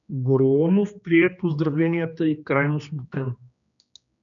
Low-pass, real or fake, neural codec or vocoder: 7.2 kHz; fake; codec, 16 kHz, 2 kbps, X-Codec, HuBERT features, trained on general audio